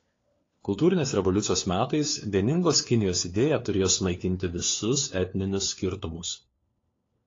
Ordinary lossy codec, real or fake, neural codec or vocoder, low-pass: AAC, 32 kbps; fake; codec, 16 kHz, 4 kbps, FunCodec, trained on LibriTTS, 50 frames a second; 7.2 kHz